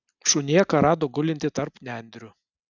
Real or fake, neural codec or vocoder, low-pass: real; none; 7.2 kHz